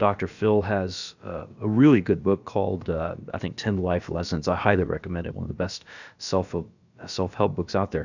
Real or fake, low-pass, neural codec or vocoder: fake; 7.2 kHz; codec, 16 kHz, about 1 kbps, DyCAST, with the encoder's durations